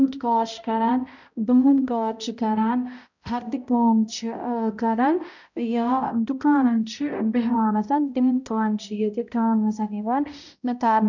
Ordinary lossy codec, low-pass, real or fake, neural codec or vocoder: none; 7.2 kHz; fake; codec, 16 kHz, 0.5 kbps, X-Codec, HuBERT features, trained on balanced general audio